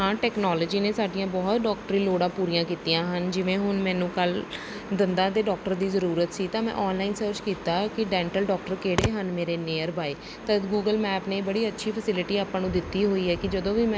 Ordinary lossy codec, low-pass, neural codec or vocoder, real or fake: none; none; none; real